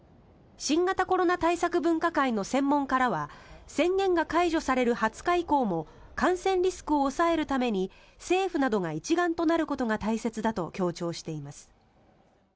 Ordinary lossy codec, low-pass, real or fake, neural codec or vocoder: none; none; real; none